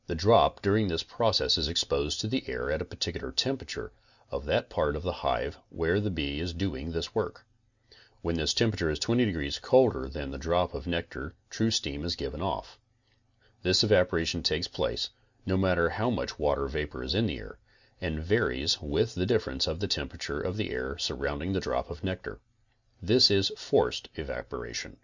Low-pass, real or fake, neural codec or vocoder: 7.2 kHz; real; none